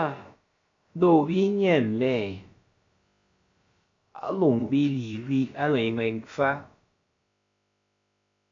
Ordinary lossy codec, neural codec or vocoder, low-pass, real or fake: AAC, 48 kbps; codec, 16 kHz, about 1 kbps, DyCAST, with the encoder's durations; 7.2 kHz; fake